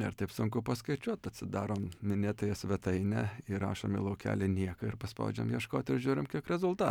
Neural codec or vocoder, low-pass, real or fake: none; 19.8 kHz; real